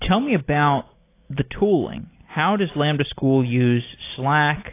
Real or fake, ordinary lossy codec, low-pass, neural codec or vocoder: real; AAC, 24 kbps; 3.6 kHz; none